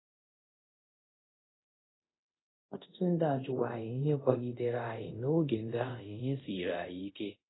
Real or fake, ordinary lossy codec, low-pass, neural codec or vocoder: fake; AAC, 16 kbps; 7.2 kHz; codec, 24 kHz, 0.5 kbps, DualCodec